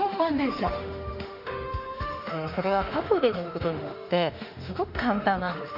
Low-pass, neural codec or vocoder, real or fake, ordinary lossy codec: 5.4 kHz; autoencoder, 48 kHz, 32 numbers a frame, DAC-VAE, trained on Japanese speech; fake; none